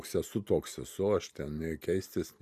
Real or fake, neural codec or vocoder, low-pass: real; none; 14.4 kHz